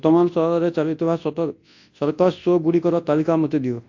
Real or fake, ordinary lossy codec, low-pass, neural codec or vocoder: fake; AAC, 48 kbps; 7.2 kHz; codec, 24 kHz, 0.9 kbps, WavTokenizer, large speech release